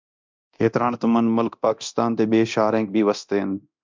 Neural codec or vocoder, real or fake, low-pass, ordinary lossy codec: codec, 24 kHz, 0.9 kbps, DualCodec; fake; 7.2 kHz; MP3, 64 kbps